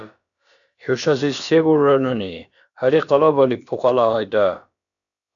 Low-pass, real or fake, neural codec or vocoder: 7.2 kHz; fake; codec, 16 kHz, about 1 kbps, DyCAST, with the encoder's durations